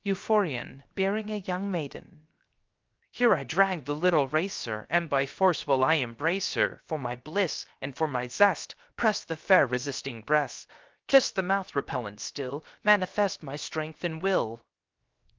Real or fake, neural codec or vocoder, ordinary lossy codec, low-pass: fake; codec, 16 kHz, 0.7 kbps, FocalCodec; Opus, 24 kbps; 7.2 kHz